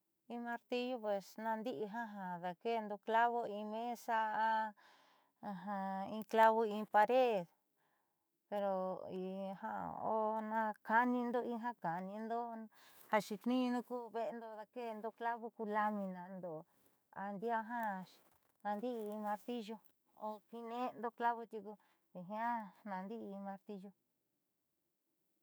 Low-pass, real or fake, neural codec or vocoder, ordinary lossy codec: none; fake; autoencoder, 48 kHz, 128 numbers a frame, DAC-VAE, trained on Japanese speech; none